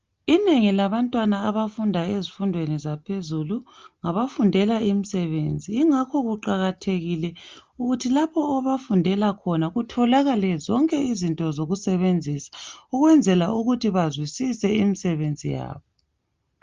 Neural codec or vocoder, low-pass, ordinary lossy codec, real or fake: none; 7.2 kHz; Opus, 32 kbps; real